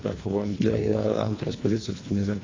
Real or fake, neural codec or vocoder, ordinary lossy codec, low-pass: fake; codec, 24 kHz, 1.5 kbps, HILCodec; AAC, 32 kbps; 7.2 kHz